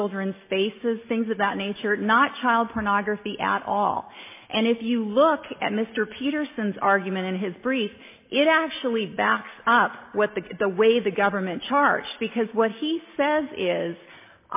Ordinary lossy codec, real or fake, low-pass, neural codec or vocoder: MP3, 24 kbps; real; 3.6 kHz; none